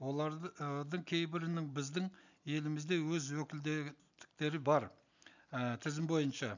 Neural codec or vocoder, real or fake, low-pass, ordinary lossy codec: none; real; 7.2 kHz; none